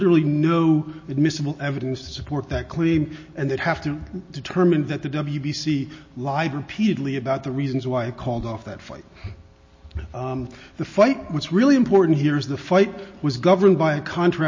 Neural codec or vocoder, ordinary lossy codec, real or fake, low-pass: none; MP3, 32 kbps; real; 7.2 kHz